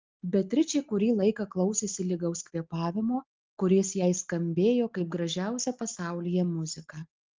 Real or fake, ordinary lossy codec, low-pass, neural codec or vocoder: real; Opus, 32 kbps; 7.2 kHz; none